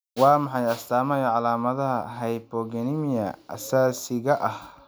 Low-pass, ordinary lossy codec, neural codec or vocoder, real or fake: none; none; none; real